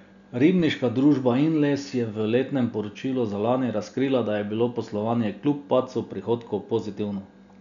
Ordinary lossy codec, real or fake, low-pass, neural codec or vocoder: MP3, 96 kbps; real; 7.2 kHz; none